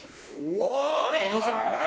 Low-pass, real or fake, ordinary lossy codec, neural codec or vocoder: none; fake; none; codec, 16 kHz, 2 kbps, X-Codec, WavLM features, trained on Multilingual LibriSpeech